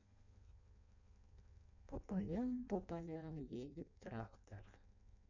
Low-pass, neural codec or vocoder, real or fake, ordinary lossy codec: 7.2 kHz; codec, 16 kHz in and 24 kHz out, 0.6 kbps, FireRedTTS-2 codec; fake; none